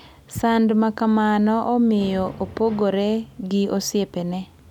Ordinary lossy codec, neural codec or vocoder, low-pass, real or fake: none; none; 19.8 kHz; real